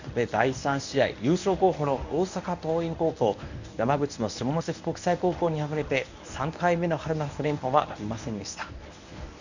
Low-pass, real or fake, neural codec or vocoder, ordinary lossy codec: 7.2 kHz; fake; codec, 24 kHz, 0.9 kbps, WavTokenizer, medium speech release version 1; none